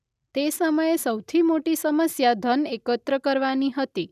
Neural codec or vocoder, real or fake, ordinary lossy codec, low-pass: none; real; none; 14.4 kHz